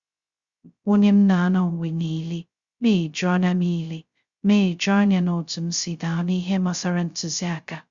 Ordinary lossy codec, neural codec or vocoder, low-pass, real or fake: Opus, 64 kbps; codec, 16 kHz, 0.2 kbps, FocalCodec; 7.2 kHz; fake